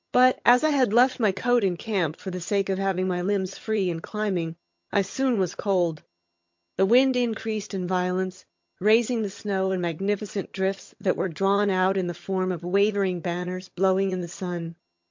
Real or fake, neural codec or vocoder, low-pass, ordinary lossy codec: fake; vocoder, 22.05 kHz, 80 mel bands, HiFi-GAN; 7.2 kHz; MP3, 48 kbps